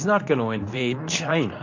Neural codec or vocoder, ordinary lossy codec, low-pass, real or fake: codec, 24 kHz, 0.9 kbps, WavTokenizer, medium speech release version 2; AAC, 48 kbps; 7.2 kHz; fake